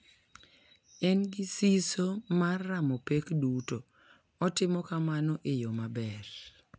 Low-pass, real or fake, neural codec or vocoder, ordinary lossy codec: none; real; none; none